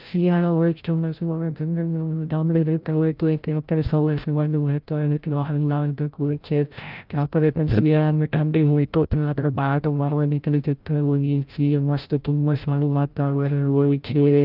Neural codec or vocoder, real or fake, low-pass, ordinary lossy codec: codec, 16 kHz, 0.5 kbps, FreqCodec, larger model; fake; 5.4 kHz; Opus, 24 kbps